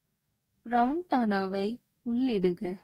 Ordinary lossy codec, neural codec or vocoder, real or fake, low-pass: AAC, 48 kbps; codec, 44.1 kHz, 2.6 kbps, DAC; fake; 19.8 kHz